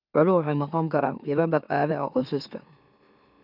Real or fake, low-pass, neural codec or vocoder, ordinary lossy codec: fake; 5.4 kHz; autoencoder, 44.1 kHz, a latent of 192 numbers a frame, MeloTTS; none